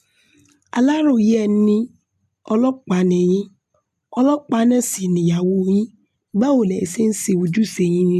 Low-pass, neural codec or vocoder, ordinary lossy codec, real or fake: 14.4 kHz; none; MP3, 96 kbps; real